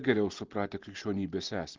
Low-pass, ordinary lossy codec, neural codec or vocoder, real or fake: 7.2 kHz; Opus, 16 kbps; none; real